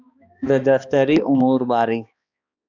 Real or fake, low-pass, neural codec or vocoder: fake; 7.2 kHz; codec, 16 kHz, 2 kbps, X-Codec, HuBERT features, trained on balanced general audio